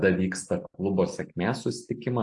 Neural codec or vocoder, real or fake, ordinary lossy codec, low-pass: none; real; AAC, 64 kbps; 10.8 kHz